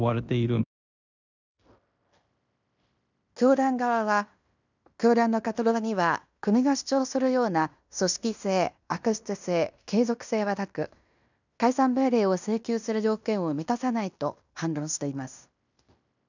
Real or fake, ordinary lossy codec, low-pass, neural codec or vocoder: fake; none; 7.2 kHz; codec, 16 kHz in and 24 kHz out, 0.9 kbps, LongCat-Audio-Codec, fine tuned four codebook decoder